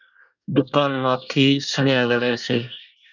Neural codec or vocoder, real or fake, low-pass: codec, 24 kHz, 1 kbps, SNAC; fake; 7.2 kHz